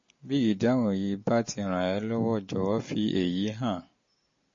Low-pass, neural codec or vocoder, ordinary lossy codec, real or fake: 7.2 kHz; none; MP3, 32 kbps; real